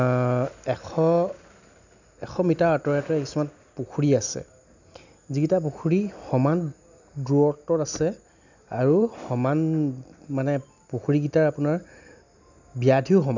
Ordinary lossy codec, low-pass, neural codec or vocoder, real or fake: none; 7.2 kHz; none; real